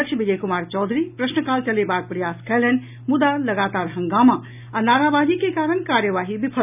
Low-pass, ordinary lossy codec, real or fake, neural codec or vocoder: 3.6 kHz; none; real; none